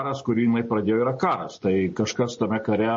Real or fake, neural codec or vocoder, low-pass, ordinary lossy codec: real; none; 10.8 kHz; MP3, 32 kbps